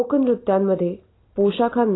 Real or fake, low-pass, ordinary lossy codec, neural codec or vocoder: real; 7.2 kHz; AAC, 16 kbps; none